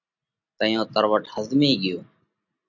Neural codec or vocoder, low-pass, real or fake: none; 7.2 kHz; real